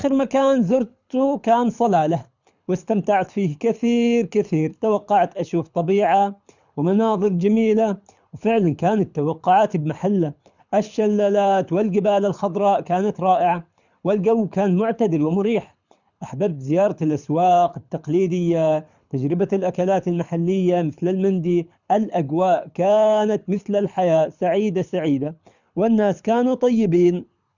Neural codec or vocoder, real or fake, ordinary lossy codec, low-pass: codec, 24 kHz, 6 kbps, HILCodec; fake; none; 7.2 kHz